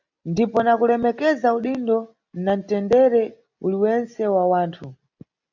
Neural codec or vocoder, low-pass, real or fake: none; 7.2 kHz; real